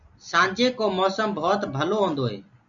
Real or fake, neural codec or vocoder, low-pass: real; none; 7.2 kHz